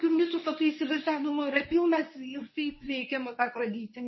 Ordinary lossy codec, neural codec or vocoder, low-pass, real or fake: MP3, 24 kbps; codec, 24 kHz, 0.9 kbps, WavTokenizer, medium speech release version 1; 7.2 kHz; fake